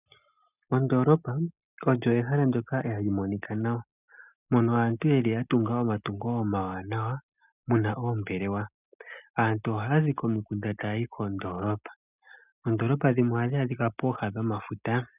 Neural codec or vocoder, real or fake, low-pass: none; real; 3.6 kHz